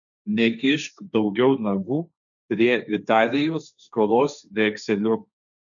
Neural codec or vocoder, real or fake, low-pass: codec, 16 kHz, 1.1 kbps, Voila-Tokenizer; fake; 7.2 kHz